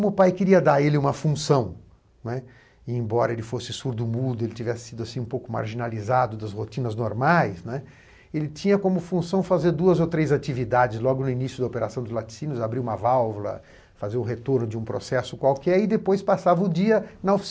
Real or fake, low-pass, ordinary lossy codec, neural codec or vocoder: real; none; none; none